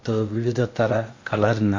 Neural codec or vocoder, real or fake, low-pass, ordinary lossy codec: codec, 16 kHz in and 24 kHz out, 0.8 kbps, FocalCodec, streaming, 65536 codes; fake; 7.2 kHz; MP3, 48 kbps